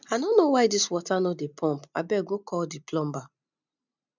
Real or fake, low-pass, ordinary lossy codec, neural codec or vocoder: real; 7.2 kHz; none; none